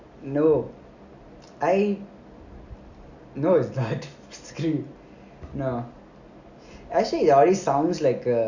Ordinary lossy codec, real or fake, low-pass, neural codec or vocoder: none; real; 7.2 kHz; none